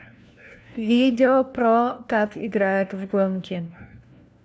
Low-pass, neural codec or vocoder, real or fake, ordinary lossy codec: none; codec, 16 kHz, 1 kbps, FunCodec, trained on LibriTTS, 50 frames a second; fake; none